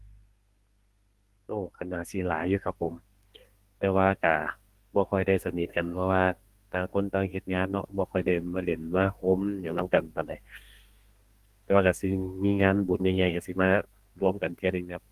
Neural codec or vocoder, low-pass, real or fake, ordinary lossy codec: codec, 32 kHz, 1.9 kbps, SNAC; 14.4 kHz; fake; Opus, 24 kbps